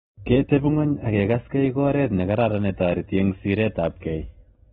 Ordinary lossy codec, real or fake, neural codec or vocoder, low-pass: AAC, 16 kbps; real; none; 19.8 kHz